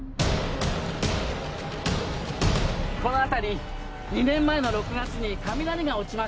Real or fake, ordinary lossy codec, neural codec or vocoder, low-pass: real; none; none; none